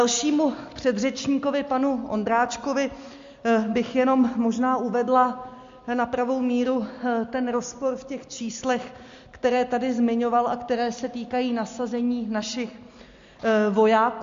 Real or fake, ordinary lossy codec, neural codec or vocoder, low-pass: real; MP3, 48 kbps; none; 7.2 kHz